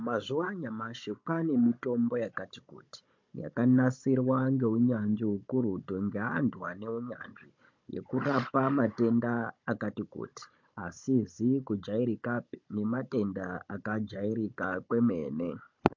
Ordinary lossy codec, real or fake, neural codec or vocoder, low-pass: MP3, 48 kbps; fake; codec, 16 kHz, 16 kbps, FunCodec, trained on Chinese and English, 50 frames a second; 7.2 kHz